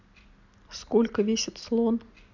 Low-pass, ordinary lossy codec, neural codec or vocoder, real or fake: 7.2 kHz; none; none; real